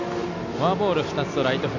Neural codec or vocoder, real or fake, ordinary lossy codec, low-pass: none; real; none; 7.2 kHz